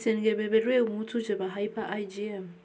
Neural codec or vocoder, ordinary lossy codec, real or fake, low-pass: none; none; real; none